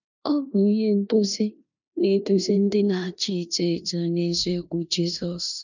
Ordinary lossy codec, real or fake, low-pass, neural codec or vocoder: none; fake; 7.2 kHz; codec, 16 kHz in and 24 kHz out, 0.9 kbps, LongCat-Audio-Codec, four codebook decoder